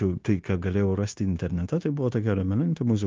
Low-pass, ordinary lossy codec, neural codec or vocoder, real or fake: 7.2 kHz; Opus, 24 kbps; codec, 16 kHz, 0.9 kbps, LongCat-Audio-Codec; fake